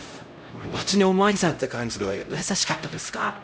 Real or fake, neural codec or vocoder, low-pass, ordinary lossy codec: fake; codec, 16 kHz, 0.5 kbps, X-Codec, HuBERT features, trained on LibriSpeech; none; none